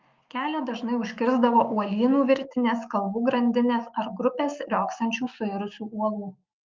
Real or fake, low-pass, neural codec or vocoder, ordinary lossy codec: fake; 7.2 kHz; autoencoder, 48 kHz, 128 numbers a frame, DAC-VAE, trained on Japanese speech; Opus, 24 kbps